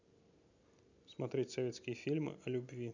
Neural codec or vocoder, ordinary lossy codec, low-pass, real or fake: none; none; 7.2 kHz; real